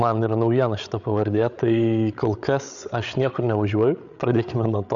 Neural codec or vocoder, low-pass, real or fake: codec, 16 kHz, 8 kbps, FreqCodec, larger model; 7.2 kHz; fake